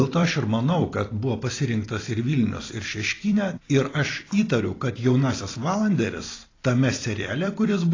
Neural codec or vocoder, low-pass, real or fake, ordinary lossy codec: vocoder, 44.1 kHz, 128 mel bands every 256 samples, BigVGAN v2; 7.2 kHz; fake; AAC, 32 kbps